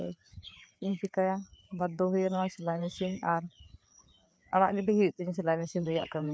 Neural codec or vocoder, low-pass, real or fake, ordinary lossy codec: codec, 16 kHz, 4 kbps, FreqCodec, larger model; none; fake; none